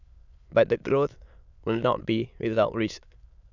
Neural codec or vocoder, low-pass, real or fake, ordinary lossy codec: autoencoder, 22.05 kHz, a latent of 192 numbers a frame, VITS, trained on many speakers; 7.2 kHz; fake; none